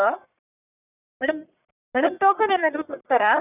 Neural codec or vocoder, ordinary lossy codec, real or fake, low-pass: codec, 44.1 kHz, 1.7 kbps, Pupu-Codec; none; fake; 3.6 kHz